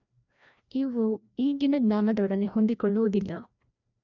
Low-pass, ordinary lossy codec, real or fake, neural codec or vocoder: 7.2 kHz; none; fake; codec, 16 kHz, 1 kbps, FreqCodec, larger model